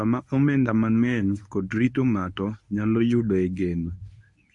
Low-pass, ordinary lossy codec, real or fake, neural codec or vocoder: 10.8 kHz; MP3, 96 kbps; fake; codec, 24 kHz, 0.9 kbps, WavTokenizer, medium speech release version 1